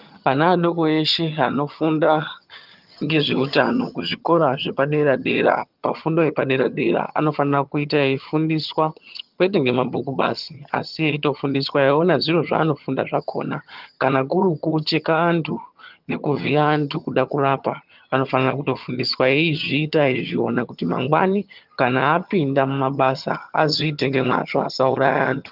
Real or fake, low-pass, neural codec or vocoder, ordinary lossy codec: fake; 5.4 kHz; vocoder, 22.05 kHz, 80 mel bands, HiFi-GAN; Opus, 24 kbps